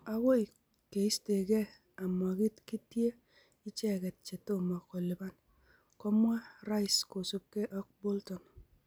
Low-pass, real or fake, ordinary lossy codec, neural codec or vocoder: none; real; none; none